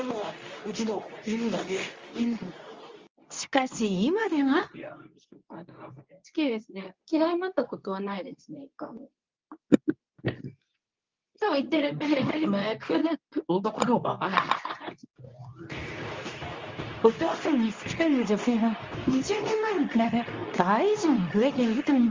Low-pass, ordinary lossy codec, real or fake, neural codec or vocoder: 7.2 kHz; Opus, 32 kbps; fake; codec, 24 kHz, 0.9 kbps, WavTokenizer, medium speech release version 2